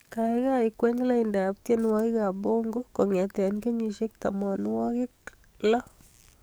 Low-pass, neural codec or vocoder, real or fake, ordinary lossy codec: none; codec, 44.1 kHz, 7.8 kbps, DAC; fake; none